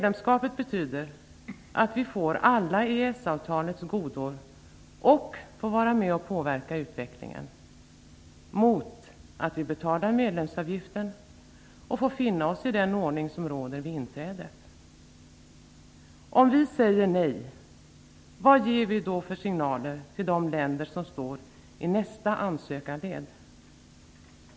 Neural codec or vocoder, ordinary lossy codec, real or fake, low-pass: none; none; real; none